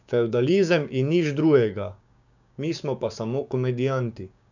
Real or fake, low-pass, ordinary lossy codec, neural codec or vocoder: fake; 7.2 kHz; none; codec, 16 kHz, 6 kbps, DAC